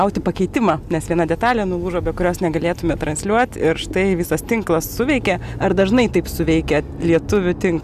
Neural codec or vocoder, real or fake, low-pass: none; real; 14.4 kHz